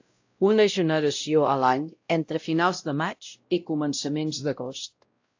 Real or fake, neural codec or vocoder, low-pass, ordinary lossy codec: fake; codec, 16 kHz, 0.5 kbps, X-Codec, WavLM features, trained on Multilingual LibriSpeech; 7.2 kHz; AAC, 48 kbps